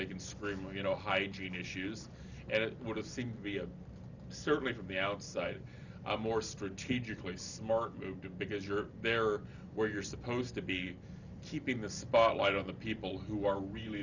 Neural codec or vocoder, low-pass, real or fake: none; 7.2 kHz; real